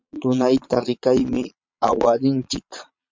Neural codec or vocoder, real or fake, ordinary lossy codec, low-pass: vocoder, 22.05 kHz, 80 mel bands, Vocos; fake; MP3, 64 kbps; 7.2 kHz